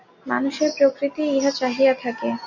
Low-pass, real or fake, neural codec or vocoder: 7.2 kHz; real; none